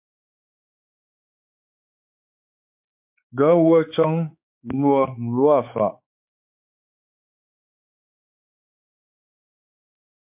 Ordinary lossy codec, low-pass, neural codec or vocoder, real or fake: MP3, 32 kbps; 3.6 kHz; codec, 16 kHz, 4 kbps, X-Codec, HuBERT features, trained on balanced general audio; fake